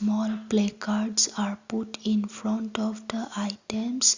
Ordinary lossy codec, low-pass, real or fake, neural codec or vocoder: Opus, 64 kbps; 7.2 kHz; real; none